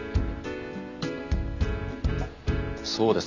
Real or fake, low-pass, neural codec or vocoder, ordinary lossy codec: real; 7.2 kHz; none; none